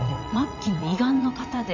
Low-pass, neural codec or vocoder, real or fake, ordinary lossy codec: 7.2 kHz; vocoder, 44.1 kHz, 80 mel bands, Vocos; fake; none